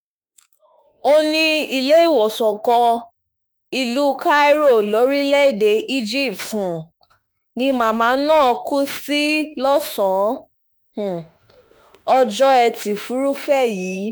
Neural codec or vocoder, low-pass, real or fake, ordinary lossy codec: autoencoder, 48 kHz, 32 numbers a frame, DAC-VAE, trained on Japanese speech; none; fake; none